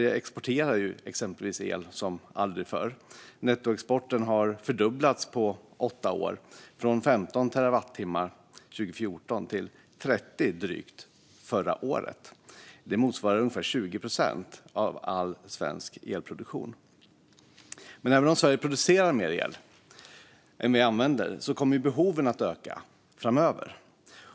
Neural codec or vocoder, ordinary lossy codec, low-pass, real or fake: none; none; none; real